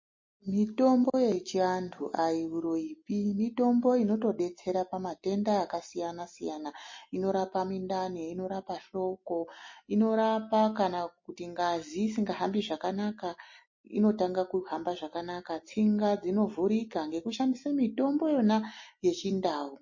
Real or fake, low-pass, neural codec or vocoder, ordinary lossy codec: real; 7.2 kHz; none; MP3, 32 kbps